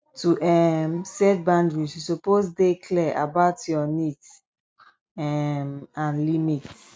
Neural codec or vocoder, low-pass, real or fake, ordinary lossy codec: none; none; real; none